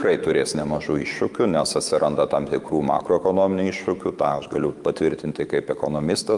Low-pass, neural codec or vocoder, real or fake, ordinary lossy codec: 10.8 kHz; autoencoder, 48 kHz, 128 numbers a frame, DAC-VAE, trained on Japanese speech; fake; Opus, 32 kbps